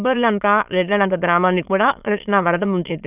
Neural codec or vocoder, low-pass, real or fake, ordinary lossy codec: autoencoder, 22.05 kHz, a latent of 192 numbers a frame, VITS, trained on many speakers; 3.6 kHz; fake; none